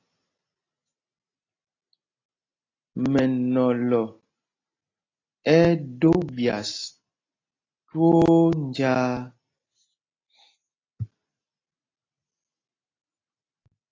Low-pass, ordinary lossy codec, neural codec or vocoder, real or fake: 7.2 kHz; AAC, 32 kbps; none; real